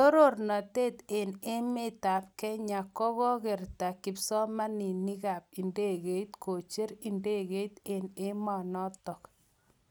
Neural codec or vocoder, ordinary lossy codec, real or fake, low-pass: none; none; real; none